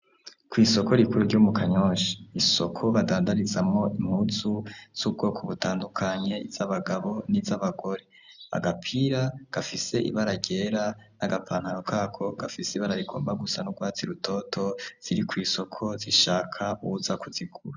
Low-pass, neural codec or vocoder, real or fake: 7.2 kHz; none; real